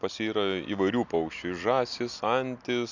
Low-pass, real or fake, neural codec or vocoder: 7.2 kHz; real; none